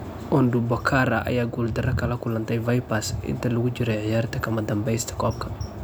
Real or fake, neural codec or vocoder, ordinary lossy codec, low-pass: real; none; none; none